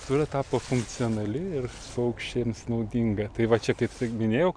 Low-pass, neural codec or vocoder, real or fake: 9.9 kHz; none; real